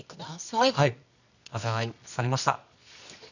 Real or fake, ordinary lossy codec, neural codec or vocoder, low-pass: fake; none; codec, 24 kHz, 0.9 kbps, WavTokenizer, medium music audio release; 7.2 kHz